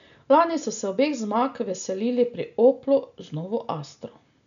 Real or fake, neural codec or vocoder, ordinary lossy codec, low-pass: real; none; none; 7.2 kHz